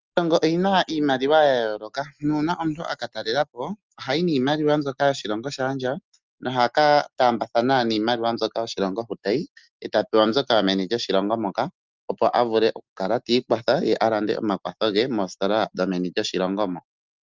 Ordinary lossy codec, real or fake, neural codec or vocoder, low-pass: Opus, 32 kbps; real; none; 7.2 kHz